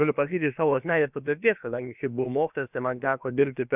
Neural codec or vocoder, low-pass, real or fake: codec, 16 kHz, about 1 kbps, DyCAST, with the encoder's durations; 3.6 kHz; fake